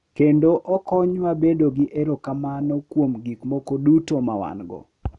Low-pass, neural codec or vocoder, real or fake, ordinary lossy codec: 10.8 kHz; none; real; none